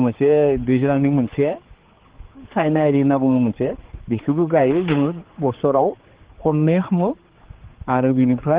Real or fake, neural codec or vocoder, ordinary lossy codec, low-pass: fake; codec, 16 kHz, 4 kbps, X-Codec, HuBERT features, trained on general audio; Opus, 16 kbps; 3.6 kHz